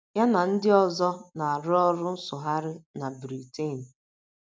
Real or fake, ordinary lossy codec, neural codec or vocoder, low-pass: real; none; none; none